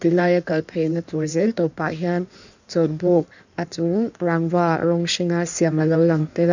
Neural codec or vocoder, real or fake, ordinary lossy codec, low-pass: codec, 16 kHz in and 24 kHz out, 1.1 kbps, FireRedTTS-2 codec; fake; none; 7.2 kHz